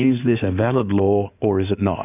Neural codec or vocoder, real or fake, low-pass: codec, 16 kHz in and 24 kHz out, 2.2 kbps, FireRedTTS-2 codec; fake; 3.6 kHz